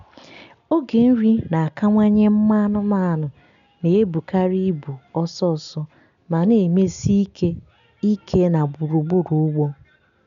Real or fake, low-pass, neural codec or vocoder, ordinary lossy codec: real; 7.2 kHz; none; none